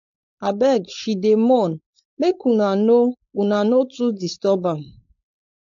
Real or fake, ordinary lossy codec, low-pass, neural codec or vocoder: fake; AAC, 48 kbps; 7.2 kHz; codec, 16 kHz, 4.8 kbps, FACodec